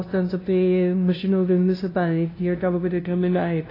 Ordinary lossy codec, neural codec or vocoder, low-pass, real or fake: AAC, 24 kbps; codec, 16 kHz, 0.5 kbps, FunCodec, trained on LibriTTS, 25 frames a second; 5.4 kHz; fake